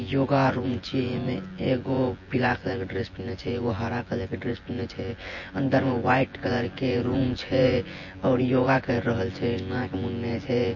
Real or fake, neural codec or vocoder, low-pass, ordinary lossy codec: fake; vocoder, 24 kHz, 100 mel bands, Vocos; 7.2 kHz; MP3, 32 kbps